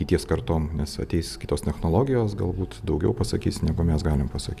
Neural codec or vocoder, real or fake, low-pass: none; real; 14.4 kHz